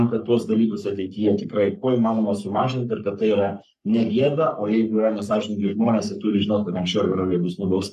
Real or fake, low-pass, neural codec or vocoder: fake; 14.4 kHz; codec, 44.1 kHz, 3.4 kbps, Pupu-Codec